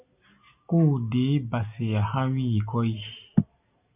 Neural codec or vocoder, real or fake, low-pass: none; real; 3.6 kHz